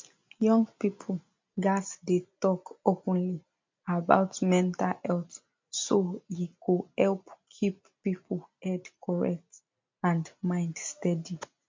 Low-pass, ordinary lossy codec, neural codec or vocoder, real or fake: 7.2 kHz; MP3, 48 kbps; none; real